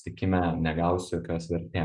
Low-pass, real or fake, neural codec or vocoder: 10.8 kHz; real; none